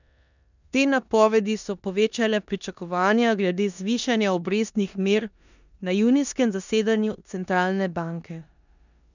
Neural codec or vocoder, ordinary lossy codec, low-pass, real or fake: codec, 16 kHz in and 24 kHz out, 0.9 kbps, LongCat-Audio-Codec, four codebook decoder; none; 7.2 kHz; fake